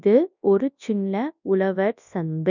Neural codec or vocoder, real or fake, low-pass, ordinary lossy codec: codec, 24 kHz, 0.9 kbps, WavTokenizer, large speech release; fake; 7.2 kHz; none